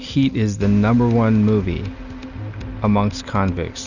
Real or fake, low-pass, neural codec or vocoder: real; 7.2 kHz; none